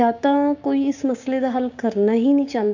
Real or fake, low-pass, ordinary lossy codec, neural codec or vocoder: fake; 7.2 kHz; none; codec, 16 kHz, 6 kbps, DAC